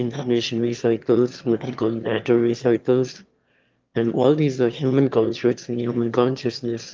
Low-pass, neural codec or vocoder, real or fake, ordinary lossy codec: 7.2 kHz; autoencoder, 22.05 kHz, a latent of 192 numbers a frame, VITS, trained on one speaker; fake; Opus, 32 kbps